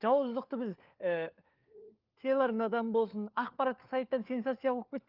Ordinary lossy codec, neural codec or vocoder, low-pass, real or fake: Opus, 32 kbps; codec, 16 kHz, 4 kbps, FunCodec, trained on LibriTTS, 50 frames a second; 5.4 kHz; fake